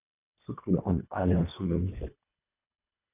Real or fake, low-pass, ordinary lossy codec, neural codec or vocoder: fake; 3.6 kHz; none; codec, 24 kHz, 1.5 kbps, HILCodec